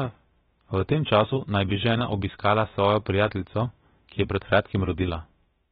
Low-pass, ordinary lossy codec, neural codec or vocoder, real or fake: 7.2 kHz; AAC, 16 kbps; codec, 16 kHz, about 1 kbps, DyCAST, with the encoder's durations; fake